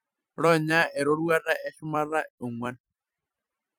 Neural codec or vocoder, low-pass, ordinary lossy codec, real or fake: vocoder, 44.1 kHz, 128 mel bands every 256 samples, BigVGAN v2; none; none; fake